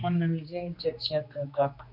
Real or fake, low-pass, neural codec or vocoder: fake; 5.4 kHz; codec, 16 kHz, 2 kbps, X-Codec, HuBERT features, trained on general audio